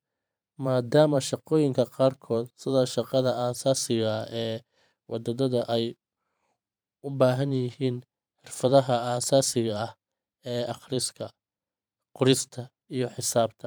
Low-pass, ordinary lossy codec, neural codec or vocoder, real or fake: none; none; vocoder, 44.1 kHz, 128 mel bands every 512 samples, BigVGAN v2; fake